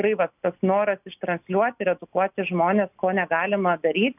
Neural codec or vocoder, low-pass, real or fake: none; 3.6 kHz; real